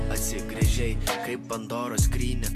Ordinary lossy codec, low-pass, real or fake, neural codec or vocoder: MP3, 96 kbps; 14.4 kHz; real; none